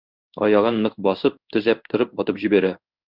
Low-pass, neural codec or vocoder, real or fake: 5.4 kHz; codec, 16 kHz in and 24 kHz out, 1 kbps, XY-Tokenizer; fake